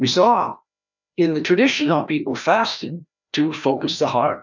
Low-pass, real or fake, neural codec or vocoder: 7.2 kHz; fake; codec, 16 kHz, 1 kbps, FreqCodec, larger model